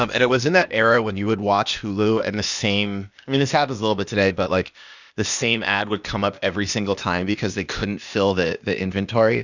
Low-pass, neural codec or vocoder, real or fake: 7.2 kHz; codec, 16 kHz, 0.8 kbps, ZipCodec; fake